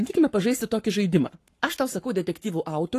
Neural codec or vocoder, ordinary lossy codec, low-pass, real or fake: codec, 44.1 kHz, 7.8 kbps, Pupu-Codec; AAC, 48 kbps; 14.4 kHz; fake